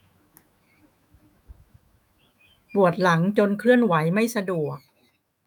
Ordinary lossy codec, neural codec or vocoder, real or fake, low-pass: none; autoencoder, 48 kHz, 128 numbers a frame, DAC-VAE, trained on Japanese speech; fake; 19.8 kHz